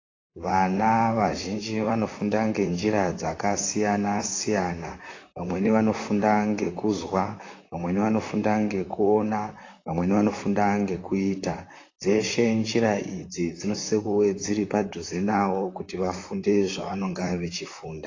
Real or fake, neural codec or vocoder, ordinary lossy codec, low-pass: fake; vocoder, 44.1 kHz, 128 mel bands, Pupu-Vocoder; AAC, 32 kbps; 7.2 kHz